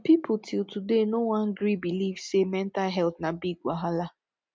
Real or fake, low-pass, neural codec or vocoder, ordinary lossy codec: real; none; none; none